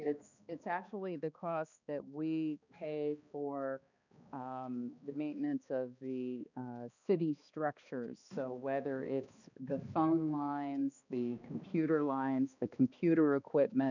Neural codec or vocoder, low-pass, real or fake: codec, 16 kHz, 2 kbps, X-Codec, HuBERT features, trained on balanced general audio; 7.2 kHz; fake